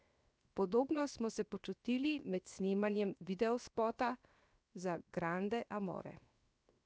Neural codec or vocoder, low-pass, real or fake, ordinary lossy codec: codec, 16 kHz, 0.7 kbps, FocalCodec; none; fake; none